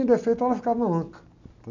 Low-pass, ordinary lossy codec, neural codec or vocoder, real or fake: 7.2 kHz; none; none; real